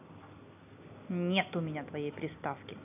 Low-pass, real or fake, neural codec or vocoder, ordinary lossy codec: 3.6 kHz; real; none; none